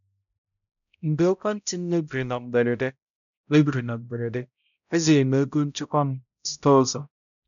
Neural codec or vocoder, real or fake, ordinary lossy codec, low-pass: codec, 16 kHz, 0.5 kbps, X-Codec, HuBERT features, trained on balanced general audio; fake; none; 7.2 kHz